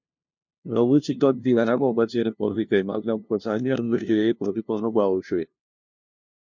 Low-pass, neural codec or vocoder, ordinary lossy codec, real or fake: 7.2 kHz; codec, 16 kHz, 0.5 kbps, FunCodec, trained on LibriTTS, 25 frames a second; MP3, 48 kbps; fake